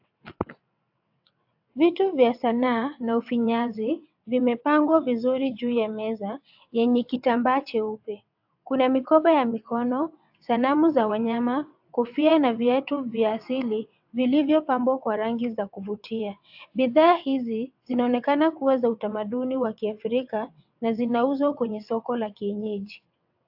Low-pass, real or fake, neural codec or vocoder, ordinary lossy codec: 5.4 kHz; fake; vocoder, 22.05 kHz, 80 mel bands, WaveNeXt; AAC, 48 kbps